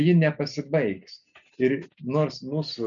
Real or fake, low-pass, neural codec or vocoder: real; 7.2 kHz; none